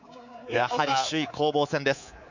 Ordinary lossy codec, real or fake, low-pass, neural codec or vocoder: none; fake; 7.2 kHz; codec, 24 kHz, 3.1 kbps, DualCodec